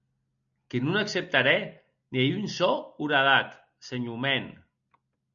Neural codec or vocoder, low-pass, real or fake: none; 7.2 kHz; real